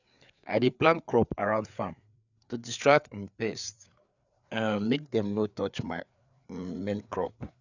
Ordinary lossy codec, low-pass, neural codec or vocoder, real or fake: none; 7.2 kHz; codec, 16 kHz, 4 kbps, FreqCodec, larger model; fake